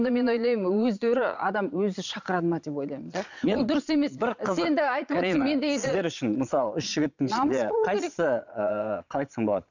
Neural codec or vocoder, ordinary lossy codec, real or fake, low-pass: vocoder, 22.05 kHz, 80 mel bands, Vocos; none; fake; 7.2 kHz